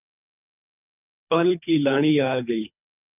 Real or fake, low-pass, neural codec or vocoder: fake; 3.6 kHz; codec, 24 kHz, 3 kbps, HILCodec